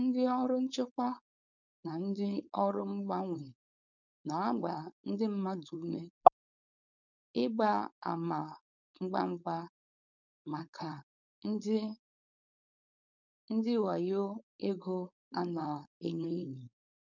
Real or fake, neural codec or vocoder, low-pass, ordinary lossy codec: fake; codec, 16 kHz, 4.8 kbps, FACodec; 7.2 kHz; none